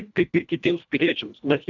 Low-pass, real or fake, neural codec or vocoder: 7.2 kHz; fake; codec, 24 kHz, 1.5 kbps, HILCodec